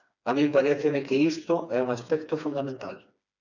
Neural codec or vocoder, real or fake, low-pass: codec, 16 kHz, 2 kbps, FreqCodec, smaller model; fake; 7.2 kHz